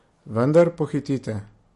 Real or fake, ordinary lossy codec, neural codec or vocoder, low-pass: real; MP3, 48 kbps; none; 14.4 kHz